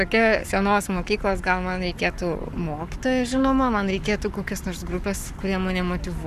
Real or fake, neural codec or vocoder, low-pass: fake; codec, 44.1 kHz, 7.8 kbps, Pupu-Codec; 14.4 kHz